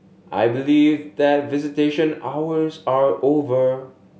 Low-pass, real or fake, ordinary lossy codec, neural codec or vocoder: none; real; none; none